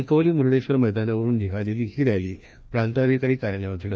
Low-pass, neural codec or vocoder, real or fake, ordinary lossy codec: none; codec, 16 kHz, 1 kbps, FreqCodec, larger model; fake; none